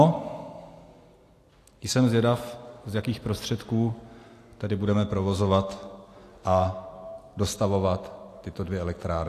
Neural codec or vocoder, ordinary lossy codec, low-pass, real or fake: none; AAC, 64 kbps; 14.4 kHz; real